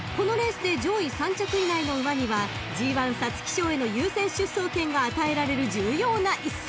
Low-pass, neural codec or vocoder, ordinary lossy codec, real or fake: none; none; none; real